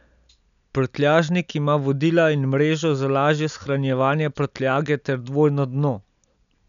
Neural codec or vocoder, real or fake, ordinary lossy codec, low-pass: none; real; none; 7.2 kHz